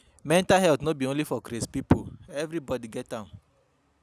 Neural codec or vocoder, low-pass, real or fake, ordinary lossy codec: none; 14.4 kHz; real; none